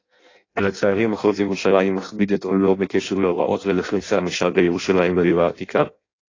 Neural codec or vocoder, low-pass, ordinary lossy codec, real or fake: codec, 16 kHz in and 24 kHz out, 0.6 kbps, FireRedTTS-2 codec; 7.2 kHz; AAC, 32 kbps; fake